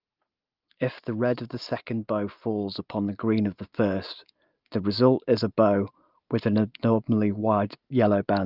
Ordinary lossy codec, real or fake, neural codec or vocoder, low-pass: Opus, 32 kbps; real; none; 5.4 kHz